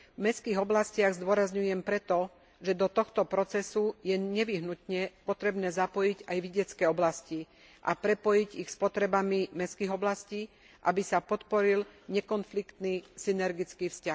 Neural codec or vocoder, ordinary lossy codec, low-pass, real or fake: none; none; none; real